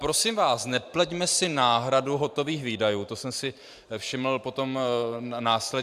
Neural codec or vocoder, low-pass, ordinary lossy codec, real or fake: vocoder, 44.1 kHz, 128 mel bands every 512 samples, BigVGAN v2; 14.4 kHz; AAC, 96 kbps; fake